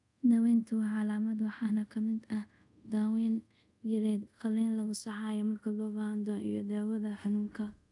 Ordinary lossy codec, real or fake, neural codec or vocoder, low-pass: none; fake; codec, 24 kHz, 0.5 kbps, DualCodec; 10.8 kHz